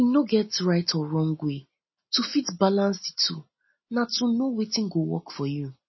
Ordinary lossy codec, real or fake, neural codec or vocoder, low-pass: MP3, 24 kbps; real; none; 7.2 kHz